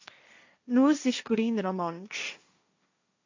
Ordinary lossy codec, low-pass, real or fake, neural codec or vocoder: AAC, 48 kbps; 7.2 kHz; fake; codec, 16 kHz, 1.1 kbps, Voila-Tokenizer